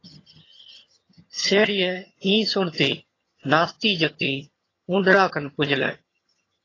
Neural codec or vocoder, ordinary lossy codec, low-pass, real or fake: vocoder, 22.05 kHz, 80 mel bands, HiFi-GAN; AAC, 32 kbps; 7.2 kHz; fake